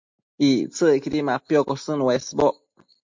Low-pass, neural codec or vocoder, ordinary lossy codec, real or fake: 7.2 kHz; none; MP3, 48 kbps; real